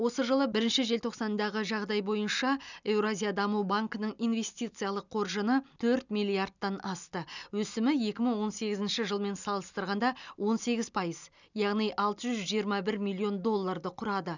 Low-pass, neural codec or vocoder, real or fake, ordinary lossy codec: 7.2 kHz; none; real; none